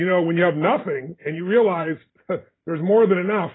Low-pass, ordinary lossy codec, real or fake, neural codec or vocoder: 7.2 kHz; AAC, 16 kbps; fake; vocoder, 44.1 kHz, 128 mel bands every 512 samples, BigVGAN v2